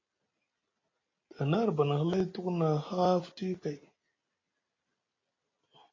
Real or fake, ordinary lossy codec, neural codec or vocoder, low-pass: real; AAC, 32 kbps; none; 7.2 kHz